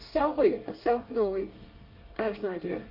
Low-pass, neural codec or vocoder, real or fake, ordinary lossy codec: 5.4 kHz; codec, 24 kHz, 1 kbps, SNAC; fake; Opus, 24 kbps